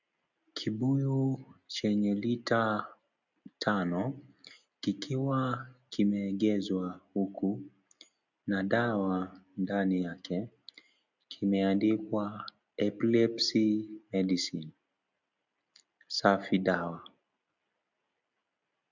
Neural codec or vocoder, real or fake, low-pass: none; real; 7.2 kHz